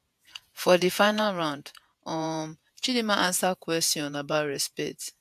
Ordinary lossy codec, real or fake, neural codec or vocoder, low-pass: AAC, 96 kbps; fake; vocoder, 48 kHz, 128 mel bands, Vocos; 14.4 kHz